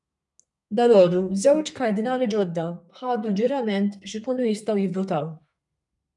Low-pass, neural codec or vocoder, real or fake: 10.8 kHz; codec, 32 kHz, 1.9 kbps, SNAC; fake